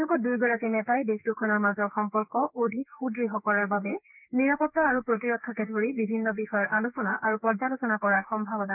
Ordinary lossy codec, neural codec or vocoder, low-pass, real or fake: none; codec, 44.1 kHz, 2.6 kbps, SNAC; 3.6 kHz; fake